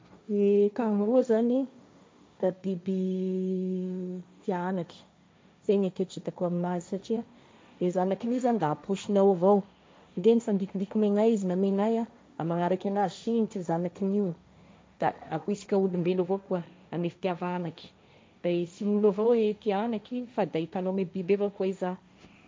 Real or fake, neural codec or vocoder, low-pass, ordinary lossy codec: fake; codec, 16 kHz, 1.1 kbps, Voila-Tokenizer; none; none